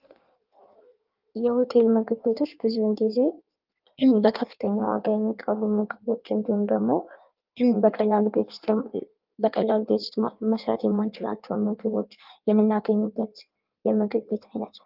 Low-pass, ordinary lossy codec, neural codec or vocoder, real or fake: 5.4 kHz; Opus, 24 kbps; codec, 16 kHz in and 24 kHz out, 1.1 kbps, FireRedTTS-2 codec; fake